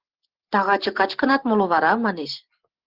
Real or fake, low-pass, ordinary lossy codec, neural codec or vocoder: real; 5.4 kHz; Opus, 16 kbps; none